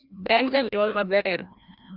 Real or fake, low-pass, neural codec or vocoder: fake; 5.4 kHz; codec, 16 kHz, 1 kbps, FreqCodec, larger model